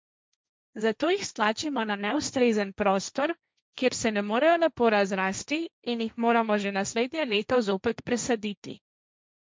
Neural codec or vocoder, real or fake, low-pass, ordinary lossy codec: codec, 16 kHz, 1.1 kbps, Voila-Tokenizer; fake; none; none